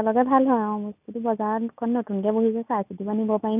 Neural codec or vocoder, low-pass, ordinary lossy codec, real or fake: none; 3.6 kHz; none; real